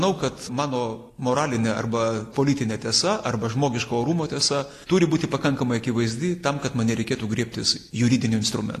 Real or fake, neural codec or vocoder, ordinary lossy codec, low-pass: real; none; AAC, 48 kbps; 14.4 kHz